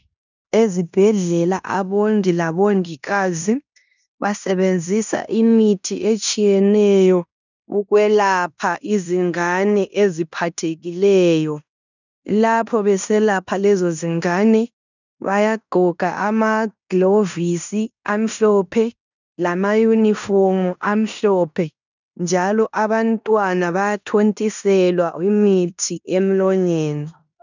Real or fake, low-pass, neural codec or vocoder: fake; 7.2 kHz; codec, 16 kHz in and 24 kHz out, 0.9 kbps, LongCat-Audio-Codec, fine tuned four codebook decoder